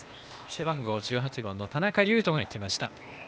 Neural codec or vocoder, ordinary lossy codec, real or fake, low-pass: codec, 16 kHz, 0.8 kbps, ZipCodec; none; fake; none